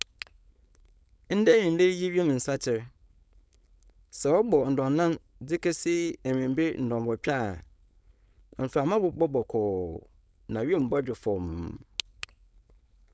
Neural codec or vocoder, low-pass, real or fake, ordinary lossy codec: codec, 16 kHz, 4.8 kbps, FACodec; none; fake; none